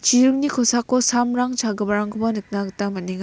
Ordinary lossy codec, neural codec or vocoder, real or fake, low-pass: none; none; real; none